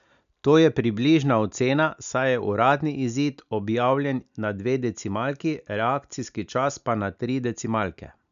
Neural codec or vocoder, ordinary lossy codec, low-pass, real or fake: none; none; 7.2 kHz; real